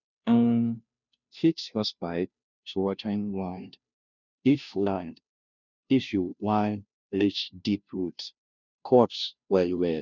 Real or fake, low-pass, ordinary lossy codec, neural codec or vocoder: fake; 7.2 kHz; none; codec, 16 kHz, 0.5 kbps, FunCodec, trained on Chinese and English, 25 frames a second